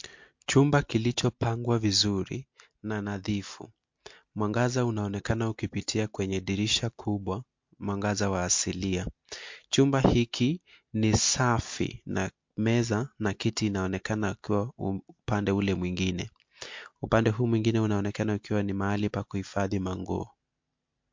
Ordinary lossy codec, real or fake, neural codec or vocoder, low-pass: MP3, 48 kbps; real; none; 7.2 kHz